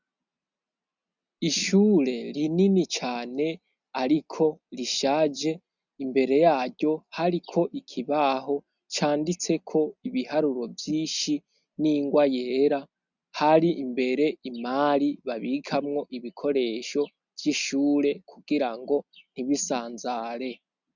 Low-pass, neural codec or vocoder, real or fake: 7.2 kHz; none; real